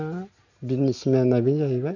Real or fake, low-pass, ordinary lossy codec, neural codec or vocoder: real; 7.2 kHz; none; none